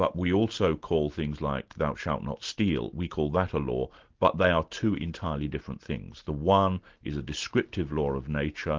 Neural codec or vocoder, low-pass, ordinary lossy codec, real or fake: none; 7.2 kHz; Opus, 16 kbps; real